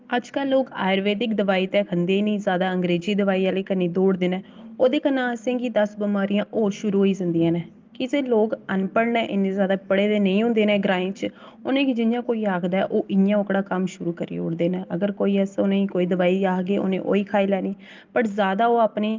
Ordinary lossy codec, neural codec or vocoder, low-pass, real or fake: Opus, 32 kbps; none; 7.2 kHz; real